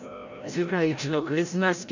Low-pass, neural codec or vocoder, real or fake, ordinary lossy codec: 7.2 kHz; codec, 16 kHz, 0.5 kbps, FreqCodec, larger model; fake; none